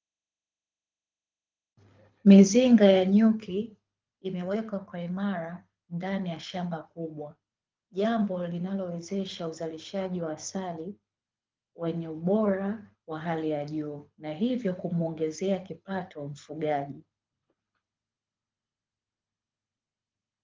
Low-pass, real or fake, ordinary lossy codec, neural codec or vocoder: 7.2 kHz; fake; Opus, 24 kbps; codec, 24 kHz, 6 kbps, HILCodec